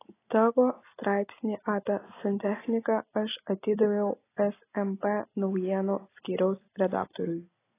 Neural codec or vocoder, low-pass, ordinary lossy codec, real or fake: none; 3.6 kHz; AAC, 16 kbps; real